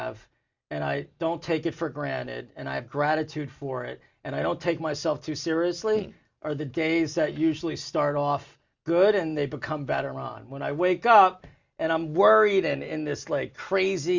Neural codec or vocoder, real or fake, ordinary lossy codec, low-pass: none; real; Opus, 64 kbps; 7.2 kHz